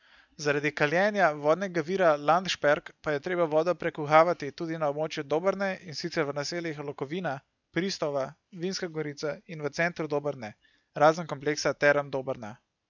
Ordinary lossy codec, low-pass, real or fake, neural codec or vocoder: none; 7.2 kHz; real; none